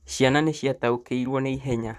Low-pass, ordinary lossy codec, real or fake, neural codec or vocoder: 14.4 kHz; none; fake; vocoder, 44.1 kHz, 128 mel bands, Pupu-Vocoder